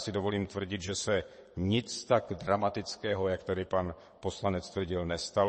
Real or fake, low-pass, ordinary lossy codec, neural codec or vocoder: fake; 9.9 kHz; MP3, 32 kbps; vocoder, 22.05 kHz, 80 mel bands, WaveNeXt